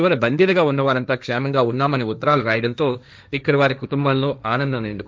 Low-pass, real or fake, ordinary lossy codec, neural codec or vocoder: none; fake; none; codec, 16 kHz, 1.1 kbps, Voila-Tokenizer